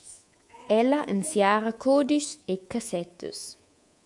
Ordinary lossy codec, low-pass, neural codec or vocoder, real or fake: MP3, 64 kbps; 10.8 kHz; codec, 24 kHz, 3.1 kbps, DualCodec; fake